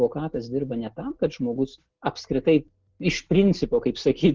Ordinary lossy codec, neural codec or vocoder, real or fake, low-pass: Opus, 16 kbps; none; real; 7.2 kHz